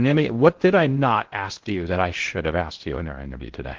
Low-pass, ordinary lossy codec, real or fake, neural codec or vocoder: 7.2 kHz; Opus, 16 kbps; fake; codec, 16 kHz in and 24 kHz out, 0.6 kbps, FocalCodec, streaming, 2048 codes